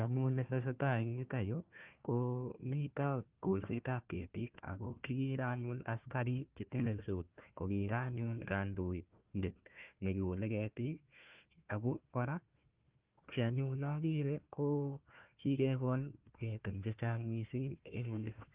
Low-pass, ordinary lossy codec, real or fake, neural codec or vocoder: 3.6 kHz; Opus, 32 kbps; fake; codec, 16 kHz, 1 kbps, FunCodec, trained on Chinese and English, 50 frames a second